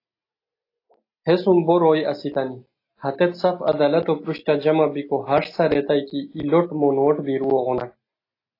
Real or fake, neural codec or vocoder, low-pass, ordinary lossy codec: real; none; 5.4 kHz; AAC, 32 kbps